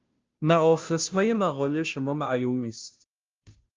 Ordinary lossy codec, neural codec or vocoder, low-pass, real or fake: Opus, 32 kbps; codec, 16 kHz, 0.5 kbps, FunCodec, trained on Chinese and English, 25 frames a second; 7.2 kHz; fake